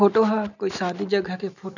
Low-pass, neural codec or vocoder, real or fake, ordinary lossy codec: 7.2 kHz; none; real; none